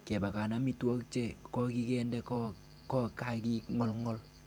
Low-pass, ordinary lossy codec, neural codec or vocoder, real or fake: 19.8 kHz; none; vocoder, 44.1 kHz, 128 mel bands every 512 samples, BigVGAN v2; fake